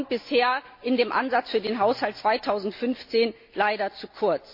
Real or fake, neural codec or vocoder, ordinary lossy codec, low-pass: real; none; MP3, 32 kbps; 5.4 kHz